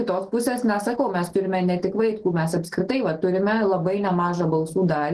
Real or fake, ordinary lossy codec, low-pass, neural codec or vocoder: real; Opus, 16 kbps; 10.8 kHz; none